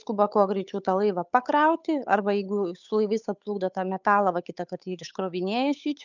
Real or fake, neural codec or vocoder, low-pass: fake; codec, 16 kHz, 8 kbps, FunCodec, trained on Chinese and English, 25 frames a second; 7.2 kHz